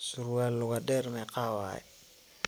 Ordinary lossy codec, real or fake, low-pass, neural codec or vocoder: none; real; none; none